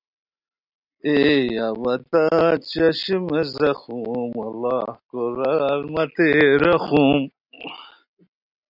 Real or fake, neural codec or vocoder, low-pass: real; none; 5.4 kHz